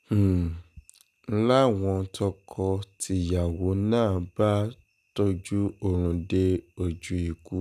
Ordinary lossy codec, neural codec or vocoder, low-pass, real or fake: none; none; 14.4 kHz; real